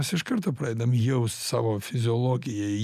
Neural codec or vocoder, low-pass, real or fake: none; 14.4 kHz; real